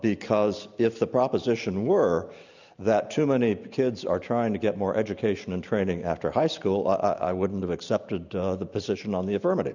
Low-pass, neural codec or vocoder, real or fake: 7.2 kHz; none; real